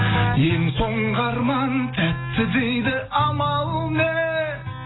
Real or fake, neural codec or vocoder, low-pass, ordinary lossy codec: real; none; 7.2 kHz; AAC, 16 kbps